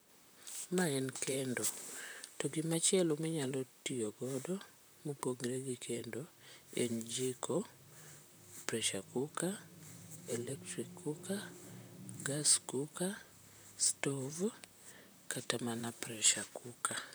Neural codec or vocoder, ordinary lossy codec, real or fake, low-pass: vocoder, 44.1 kHz, 128 mel bands, Pupu-Vocoder; none; fake; none